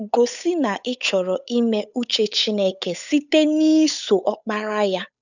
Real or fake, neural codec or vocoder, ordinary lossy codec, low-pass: fake; codec, 16 kHz, 8 kbps, FunCodec, trained on Chinese and English, 25 frames a second; none; 7.2 kHz